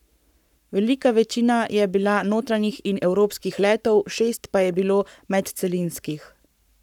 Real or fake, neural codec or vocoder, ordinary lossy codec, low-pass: fake; codec, 44.1 kHz, 7.8 kbps, Pupu-Codec; none; 19.8 kHz